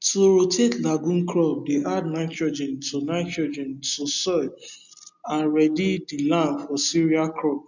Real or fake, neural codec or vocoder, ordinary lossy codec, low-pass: real; none; none; 7.2 kHz